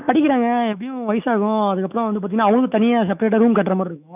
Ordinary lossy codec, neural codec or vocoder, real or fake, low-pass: none; autoencoder, 48 kHz, 128 numbers a frame, DAC-VAE, trained on Japanese speech; fake; 3.6 kHz